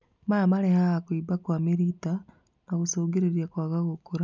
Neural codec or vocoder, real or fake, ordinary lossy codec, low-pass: none; real; none; 7.2 kHz